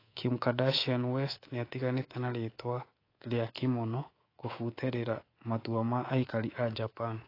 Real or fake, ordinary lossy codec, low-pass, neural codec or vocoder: fake; AAC, 24 kbps; 5.4 kHz; codec, 24 kHz, 3.1 kbps, DualCodec